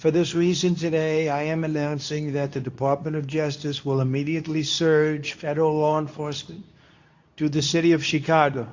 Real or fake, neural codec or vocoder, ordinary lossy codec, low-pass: fake; codec, 24 kHz, 0.9 kbps, WavTokenizer, medium speech release version 2; AAC, 48 kbps; 7.2 kHz